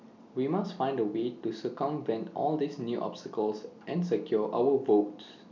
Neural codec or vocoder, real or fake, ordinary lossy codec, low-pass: none; real; none; 7.2 kHz